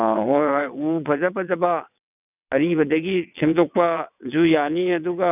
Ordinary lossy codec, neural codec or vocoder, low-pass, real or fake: none; vocoder, 22.05 kHz, 80 mel bands, WaveNeXt; 3.6 kHz; fake